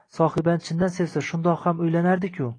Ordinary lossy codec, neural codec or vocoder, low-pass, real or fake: AAC, 32 kbps; none; 9.9 kHz; real